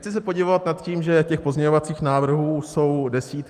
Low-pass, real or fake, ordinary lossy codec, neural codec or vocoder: 14.4 kHz; real; Opus, 32 kbps; none